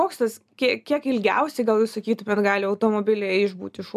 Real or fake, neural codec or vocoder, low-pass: real; none; 14.4 kHz